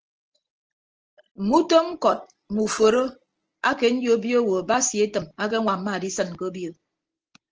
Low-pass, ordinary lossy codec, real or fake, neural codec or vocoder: 7.2 kHz; Opus, 16 kbps; real; none